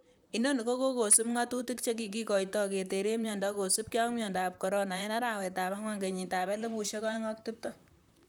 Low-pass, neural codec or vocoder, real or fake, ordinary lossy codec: none; vocoder, 44.1 kHz, 128 mel bands, Pupu-Vocoder; fake; none